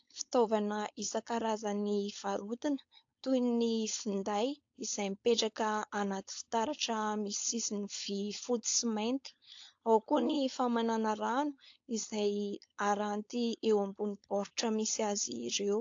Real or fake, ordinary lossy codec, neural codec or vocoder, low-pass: fake; AAC, 48 kbps; codec, 16 kHz, 4.8 kbps, FACodec; 7.2 kHz